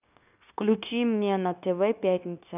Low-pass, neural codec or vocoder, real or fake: 3.6 kHz; codec, 16 kHz, 0.9 kbps, LongCat-Audio-Codec; fake